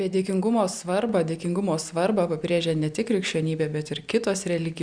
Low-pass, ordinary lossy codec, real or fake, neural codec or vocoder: 9.9 kHz; Opus, 64 kbps; real; none